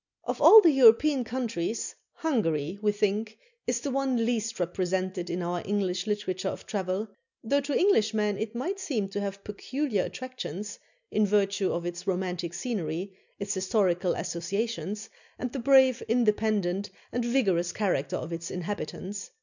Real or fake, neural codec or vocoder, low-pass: real; none; 7.2 kHz